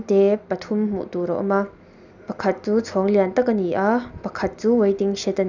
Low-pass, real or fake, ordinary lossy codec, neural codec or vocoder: 7.2 kHz; real; none; none